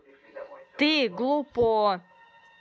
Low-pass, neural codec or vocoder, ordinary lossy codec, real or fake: none; none; none; real